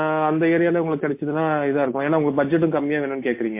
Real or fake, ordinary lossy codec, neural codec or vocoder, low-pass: real; MP3, 24 kbps; none; 3.6 kHz